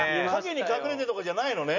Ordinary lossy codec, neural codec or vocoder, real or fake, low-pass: none; none; real; 7.2 kHz